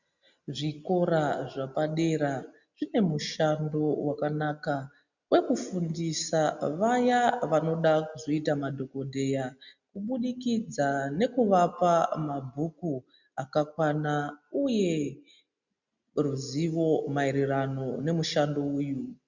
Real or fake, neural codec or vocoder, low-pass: real; none; 7.2 kHz